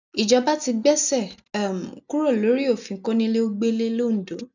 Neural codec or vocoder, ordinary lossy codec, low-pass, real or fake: none; none; 7.2 kHz; real